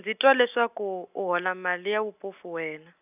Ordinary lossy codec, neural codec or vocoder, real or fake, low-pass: none; none; real; 3.6 kHz